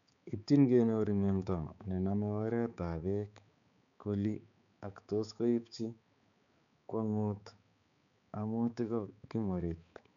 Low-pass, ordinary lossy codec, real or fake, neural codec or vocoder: 7.2 kHz; none; fake; codec, 16 kHz, 4 kbps, X-Codec, HuBERT features, trained on balanced general audio